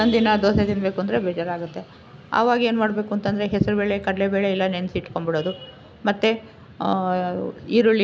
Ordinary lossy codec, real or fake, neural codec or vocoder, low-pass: none; real; none; none